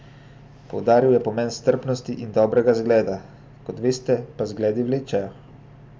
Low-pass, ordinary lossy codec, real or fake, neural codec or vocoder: none; none; real; none